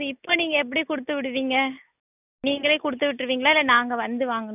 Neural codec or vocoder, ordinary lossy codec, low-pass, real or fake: none; none; 3.6 kHz; real